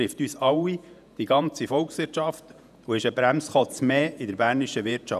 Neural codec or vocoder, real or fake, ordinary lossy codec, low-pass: vocoder, 48 kHz, 128 mel bands, Vocos; fake; none; 14.4 kHz